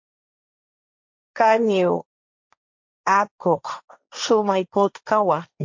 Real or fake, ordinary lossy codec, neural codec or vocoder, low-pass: fake; MP3, 48 kbps; codec, 16 kHz, 1.1 kbps, Voila-Tokenizer; 7.2 kHz